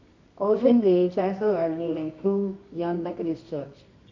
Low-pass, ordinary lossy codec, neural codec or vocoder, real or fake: 7.2 kHz; MP3, 64 kbps; codec, 24 kHz, 0.9 kbps, WavTokenizer, medium music audio release; fake